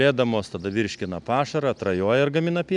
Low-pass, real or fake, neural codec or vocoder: 10.8 kHz; real; none